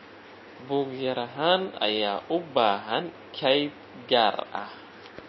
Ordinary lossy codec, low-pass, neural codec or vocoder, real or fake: MP3, 24 kbps; 7.2 kHz; none; real